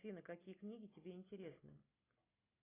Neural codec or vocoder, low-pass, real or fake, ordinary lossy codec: none; 3.6 kHz; real; AAC, 16 kbps